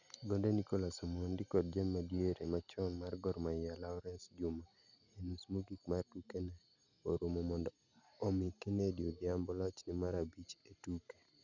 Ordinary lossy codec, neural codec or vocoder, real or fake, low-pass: none; none; real; 7.2 kHz